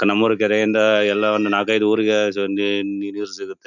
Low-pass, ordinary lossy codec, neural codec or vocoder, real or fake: 7.2 kHz; none; none; real